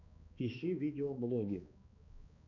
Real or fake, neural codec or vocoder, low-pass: fake; codec, 16 kHz, 2 kbps, X-Codec, HuBERT features, trained on balanced general audio; 7.2 kHz